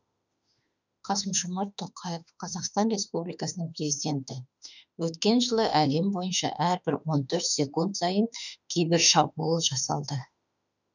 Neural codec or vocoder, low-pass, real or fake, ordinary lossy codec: autoencoder, 48 kHz, 32 numbers a frame, DAC-VAE, trained on Japanese speech; 7.2 kHz; fake; none